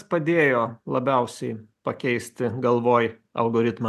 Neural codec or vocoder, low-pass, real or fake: none; 14.4 kHz; real